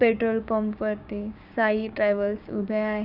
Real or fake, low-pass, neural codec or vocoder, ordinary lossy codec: fake; 5.4 kHz; codec, 16 kHz, 6 kbps, DAC; none